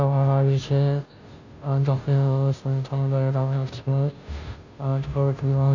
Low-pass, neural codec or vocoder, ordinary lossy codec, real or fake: 7.2 kHz; codec, 16 kHz, 0.5 kbps, FunCodec, trained on Chinese and English, 25 frames a second; none; fake